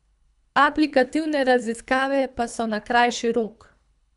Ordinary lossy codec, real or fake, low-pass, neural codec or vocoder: none; fake; 10.8 kHz; codec, 24 kHz, 3 kbps, HILCodec